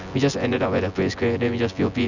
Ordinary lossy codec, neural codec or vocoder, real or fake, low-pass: none; vocoder, 24 kHz, 100 mel bands, Vocos; fake; 7.2 kHz